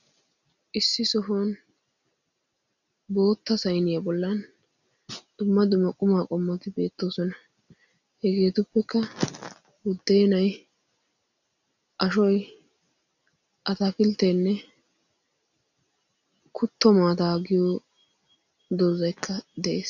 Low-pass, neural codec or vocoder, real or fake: 7.2 kHz; none; real